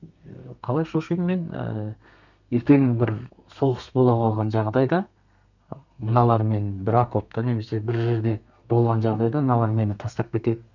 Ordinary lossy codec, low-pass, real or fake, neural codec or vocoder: none; 7.2 kHz; fake; codec, 32 kHz, 1.9 kbps, SNAC